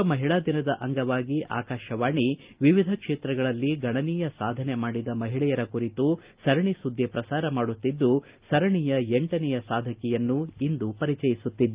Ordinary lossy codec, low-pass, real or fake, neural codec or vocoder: Opus, 32 kbps; 3.6 kHz; real; none